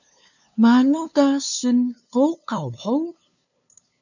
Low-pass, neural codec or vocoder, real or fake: 7.2 kHz; codec, 16 kHz, 2 kbps, FunCodec, trained on LibriTTS, 25 frames a second; fake